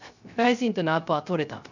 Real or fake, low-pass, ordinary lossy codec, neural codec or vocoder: fake; 7.2 kHz; none; codec, 16 kHz, 0.3 kbps, FocalCodec